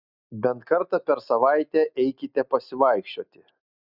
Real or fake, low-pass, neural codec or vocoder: real; 5.4 kHz; none